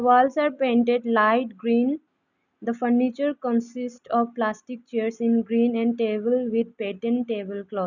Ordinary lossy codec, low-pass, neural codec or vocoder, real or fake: none; 7.2 kHz; none; real